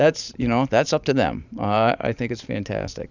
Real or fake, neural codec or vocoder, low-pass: fake; vocoder, 44.1 kHz, 128 mel bands every 512 samples, BigVGAN v2; 7.2 kHz